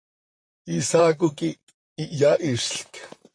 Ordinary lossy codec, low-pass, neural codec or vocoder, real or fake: MP3, 48 kbps; 9.9 kHz; vocoder, 44.1 kHz, 128 mel bands, Pupu-Vocoder; fake